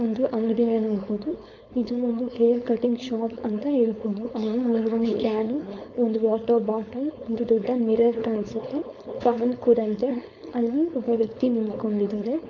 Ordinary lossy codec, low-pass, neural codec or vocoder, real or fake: none; 7.2 kHz; codec, 16 kHz, 4.8 kbps, FACodec; fake